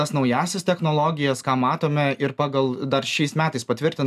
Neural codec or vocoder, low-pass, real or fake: none; 14.4 kHz; real